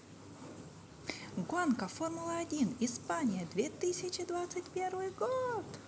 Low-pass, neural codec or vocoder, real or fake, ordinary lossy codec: none; none; real; none